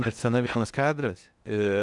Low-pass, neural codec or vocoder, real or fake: 10.8 kHz; codec, 16 kHz in and 24 kHz out, 0.8 kbps, FocalCodec, streaming, 65536 codes; fake